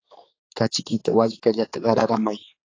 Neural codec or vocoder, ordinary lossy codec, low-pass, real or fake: codec, 16 kHz, 4 kbps, X-Codec, HuBERT features, trained on general audio; AAC, 32 kbps; 7.2 kHz; fake